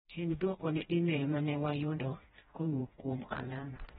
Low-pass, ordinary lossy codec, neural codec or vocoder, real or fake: 7.2 kHz; AAC, 16 kbps; codec, 16 kHz, 1 kbps, FreqCodec, smaller model; fake